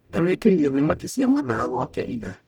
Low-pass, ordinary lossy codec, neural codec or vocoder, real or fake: 19.8 kHz; none; codec, 44.1 kHz, 0.9 kbps, DAC; fake